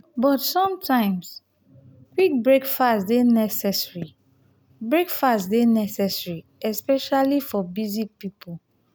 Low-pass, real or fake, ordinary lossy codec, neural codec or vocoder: none; real; none; none